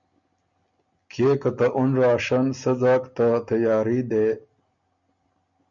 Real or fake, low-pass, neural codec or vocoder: real; 7.2 kHz; none